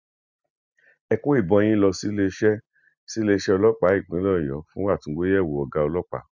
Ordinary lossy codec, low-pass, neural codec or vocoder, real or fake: none; 7.2 kHz; none; real